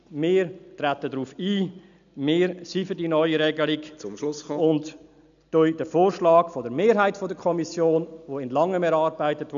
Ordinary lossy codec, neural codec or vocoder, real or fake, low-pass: none; none; real; 7.2 kHz